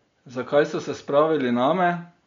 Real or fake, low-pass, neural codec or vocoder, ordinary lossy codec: real; 7.2 kHz; none; MP3, 48 kbps